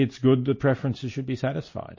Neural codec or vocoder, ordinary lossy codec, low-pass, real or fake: none; MP3, 32 kbps; 7.2 kHz; real